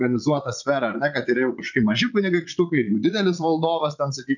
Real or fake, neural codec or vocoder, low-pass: fake; vocoder, 22.05 kHz, 80 mel bands, Vocos; 7.2 kHz